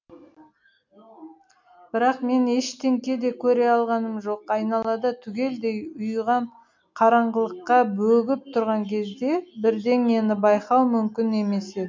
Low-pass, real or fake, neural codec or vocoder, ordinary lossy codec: 7.2 kHz; real; none; MP3, 64 kbps